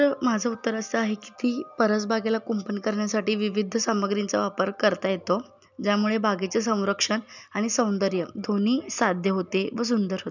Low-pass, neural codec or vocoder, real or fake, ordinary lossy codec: 7.2 kHz; none; real; none